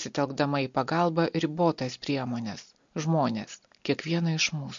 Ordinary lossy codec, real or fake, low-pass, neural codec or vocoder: MP3, 48 kbps; real; 7.2 kHz; none